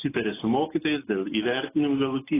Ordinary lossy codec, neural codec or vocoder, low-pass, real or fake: AAC, 16 kbps; none; 3.6 kHz; real